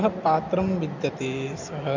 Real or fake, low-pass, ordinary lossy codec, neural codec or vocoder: real; 7.2 kHz; none; none